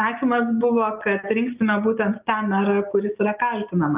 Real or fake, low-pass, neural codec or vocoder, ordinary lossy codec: real; 3.6 kHz; none; Opus, 24 kbps